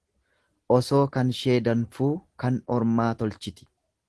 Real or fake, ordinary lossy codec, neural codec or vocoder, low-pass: real; Opus, 16 kbps; none; 10.8 kHz